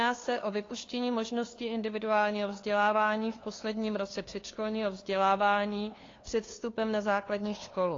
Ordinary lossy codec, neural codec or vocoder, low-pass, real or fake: AAC, 32 kbps; codec, 16 kHz, 2 kbps, FunCodec, trained on LibriTTS, 25 frames a second; 7.2 kHz; fake